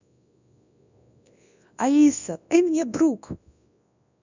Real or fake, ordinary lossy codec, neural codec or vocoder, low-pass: fake; none; codec, 24 kHz, 0.9 kbps, WavTokenizer, large speech release; 7.2 kHz